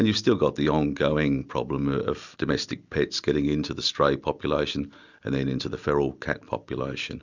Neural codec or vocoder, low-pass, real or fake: none; 7.2 kHz; real